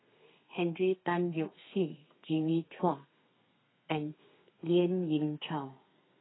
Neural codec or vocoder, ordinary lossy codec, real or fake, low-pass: codec, 32 kHz, 1.9 kbps, SNAC; AAC, 16 kbps; fake; 7.2 kHz